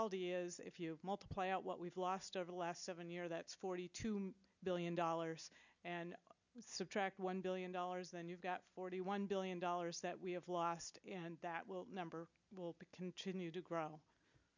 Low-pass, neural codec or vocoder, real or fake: 7.2 kHz; none; real